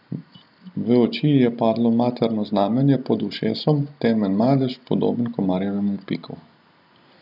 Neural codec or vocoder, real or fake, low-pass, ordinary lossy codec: none; real; 5.4 kHz; none